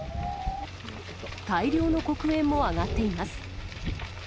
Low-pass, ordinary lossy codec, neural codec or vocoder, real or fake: none; none; none; real